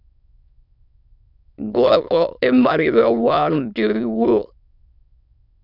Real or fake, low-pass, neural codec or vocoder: fake; 5.4 kHz; autoencoder, 22.05 kHz, a latent of 192 numbers a frame, VITS, trained on many speakers